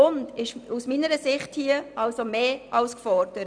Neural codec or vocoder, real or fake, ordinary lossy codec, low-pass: none; real; none; 9.9 kHz